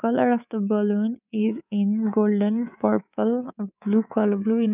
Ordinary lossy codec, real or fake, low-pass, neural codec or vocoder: none; fake; 3.6 kHz; codec, 24 kHz, 3.1 kbps, DualCodec